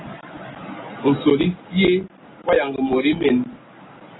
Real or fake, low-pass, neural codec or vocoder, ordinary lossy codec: real; 7.2 kHz; none; AAC, 16 kbps